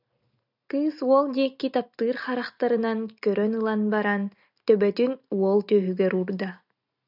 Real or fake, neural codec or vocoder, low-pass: real; none; 5.4 kHz